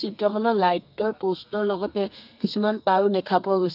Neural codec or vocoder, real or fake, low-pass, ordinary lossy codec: codec, 32 kHz, 1.9 kbps, SNAC; fake; 5.4 kHz; none